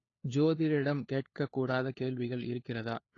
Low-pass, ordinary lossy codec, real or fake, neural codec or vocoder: 7.2 kHz; AAC, 32 kbps; fake; codec, 16 kHz, 4 kbps, FunCodec, trained on LibriTTS, 50 frames a second